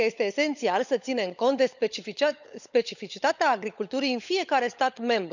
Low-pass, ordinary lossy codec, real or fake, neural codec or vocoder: 7.2 kHz; none; fake; codec, 16 kHz, 16 kbps, FunCodec, trained on LibriTTS, 50 frames a second